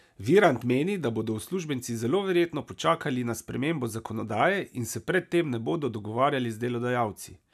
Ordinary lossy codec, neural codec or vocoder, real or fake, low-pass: none; none; real; 14.4 kHz